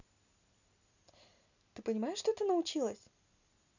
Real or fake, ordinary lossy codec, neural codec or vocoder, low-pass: real; none; none; 7.2 kHz